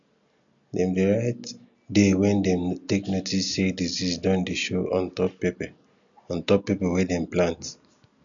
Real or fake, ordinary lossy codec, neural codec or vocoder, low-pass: real; none; none; 7.2 kHz